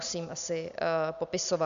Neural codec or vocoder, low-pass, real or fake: none; 7.2 kHz; real